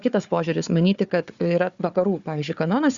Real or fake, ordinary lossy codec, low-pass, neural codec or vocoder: fake; Opus, 64 kbps; 7.2 kHz; codec, 16 kHz, 4 kbps, FunCodec, trained on Chinese and English, 50 frames a second